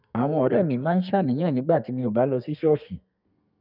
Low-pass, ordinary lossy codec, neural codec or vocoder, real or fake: 5.4 kHz; none; codec, 32 kHz, 1.9 kbps, SNAC; fake